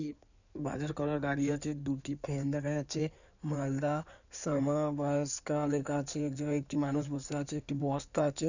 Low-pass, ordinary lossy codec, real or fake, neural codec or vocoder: 7.2 kHz; none; fake; codec, 16 kHz in and 24 kHz out, 2.2 kbps, FireRedTTS-2 codec